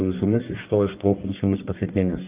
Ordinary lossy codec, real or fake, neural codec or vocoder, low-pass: Opus, 24 kbps; fake; codec, 44.1 kHz, 1.7 kbps, Pupu-Codec; 3.6 kHz